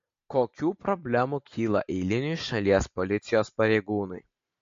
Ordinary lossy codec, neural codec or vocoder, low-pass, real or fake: MP3, 48 kbps; none; 7.2 kHz; real